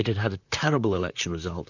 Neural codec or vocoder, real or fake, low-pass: vocoder, 44.1 kHz, 128 mel bands, Pupu-Vocoder; fake; 7.2 kHz